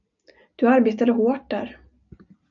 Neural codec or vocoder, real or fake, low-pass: none; real; 7.2 kHz